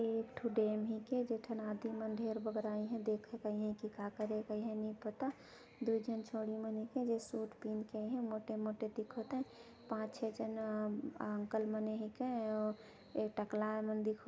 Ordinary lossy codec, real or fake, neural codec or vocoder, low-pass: none; real; none; none